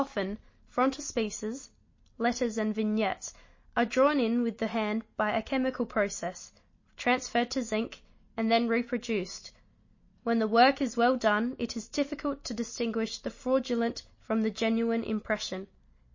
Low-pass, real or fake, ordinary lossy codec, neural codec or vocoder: 7.2 kHz; real; MP3, 32 kbps; none